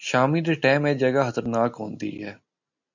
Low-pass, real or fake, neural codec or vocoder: 7.2 kHz; real; none